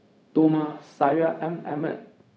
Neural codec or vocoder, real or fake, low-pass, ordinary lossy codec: codec, 16 kHz, 0.4 kbps, LongCat-Audio-Codec; fake; none; none